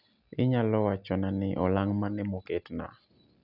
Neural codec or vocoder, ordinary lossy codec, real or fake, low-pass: none; none; real; 5.4 kHz